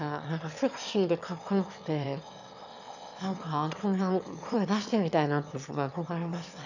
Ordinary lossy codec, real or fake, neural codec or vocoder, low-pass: none; fake; autoencoder, 22.05 kHz, a latent of 192 numbers a frame, VITS, trained on one speaker; 7.2 kHz